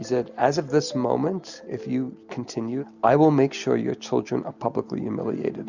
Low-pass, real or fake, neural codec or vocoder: 7.2 kHz; real; none